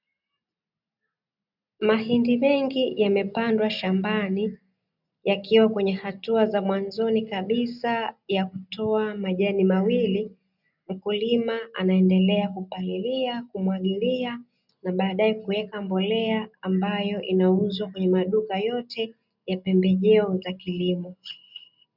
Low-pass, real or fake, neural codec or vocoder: 5.4 kHz; real; none